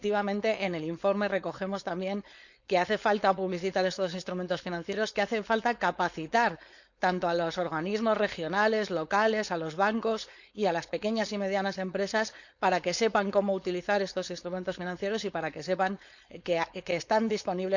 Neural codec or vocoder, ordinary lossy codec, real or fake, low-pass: codec, 16 kHz, 4.8 kbps, FACodec; none; fake; 7.2 kHz